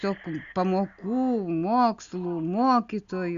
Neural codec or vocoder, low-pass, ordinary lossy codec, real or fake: none; 7.2 kHz; Opus, 64 kbps; real